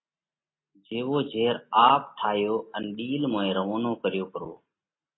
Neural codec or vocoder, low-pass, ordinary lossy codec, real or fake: none; 7.2 kHz; AAC, 16 kbps; real